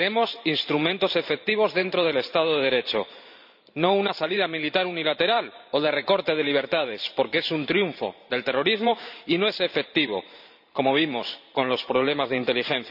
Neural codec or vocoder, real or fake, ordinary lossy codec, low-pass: vocoder, 44.1 kHz, 128 mel bands every 512 samples, BigVGAN v2; fake; none; 5.4 kHz